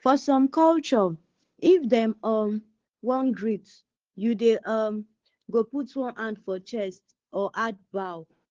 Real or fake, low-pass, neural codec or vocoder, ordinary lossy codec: fake; 7.2 kHz; codec, 16 kHz, 2 kbps, FunCodec, trained on Chinese and English, 25 frames a second; Opus, 16 kbps